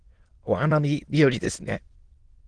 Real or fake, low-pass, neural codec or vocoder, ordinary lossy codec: fake; 9.9 kHz; autoencoder, 22.05 kHz, a latent of 192 numbers a frame, VITS, trained on many speakers; Opus, 16 kbps